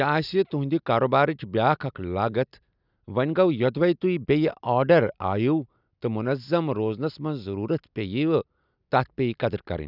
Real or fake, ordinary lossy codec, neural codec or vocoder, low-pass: real; none; none; 5.4 kHz